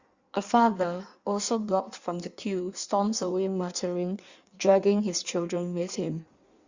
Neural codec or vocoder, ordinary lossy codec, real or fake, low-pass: codec, 16 kHz in and 24 kHz out, 1.1 kbps, FireRedTTS-2 codec; Opus, 64 kbps; fake; 7.2 kHz